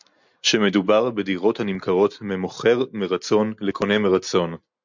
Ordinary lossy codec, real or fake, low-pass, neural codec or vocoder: MP3, 64 kbps; real; 7.2 kHz; none